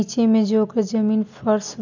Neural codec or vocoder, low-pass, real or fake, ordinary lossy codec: none; 7.2 kHz; real; none